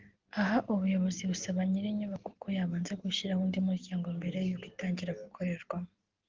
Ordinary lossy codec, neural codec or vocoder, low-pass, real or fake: Opus, 16 kbps; none; 7.2 kHz; real